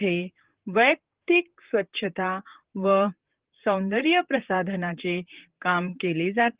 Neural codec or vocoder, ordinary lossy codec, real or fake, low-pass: vocoder, 44.1 kHz, 128 mel bands, Pupu-Vocoder; Opus, 16 kbps; fake; 3.6 kHz